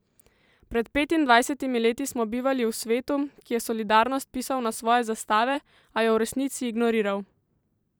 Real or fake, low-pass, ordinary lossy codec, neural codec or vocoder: fake; none; none; vocoder, 44.1 kHz, 128 mel bands every 512 samples, BigVGAN v2